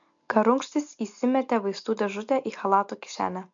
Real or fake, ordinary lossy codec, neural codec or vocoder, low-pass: real; MP3, 64 kbps; none; 7.2 kHz